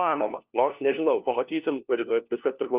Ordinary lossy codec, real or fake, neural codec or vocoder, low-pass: Opus, 24 kbps; fake; codec, 16 kHz, 1 kbps, FunCodec, trained on LibriTTS, 50 frames a second; 3.6 kHz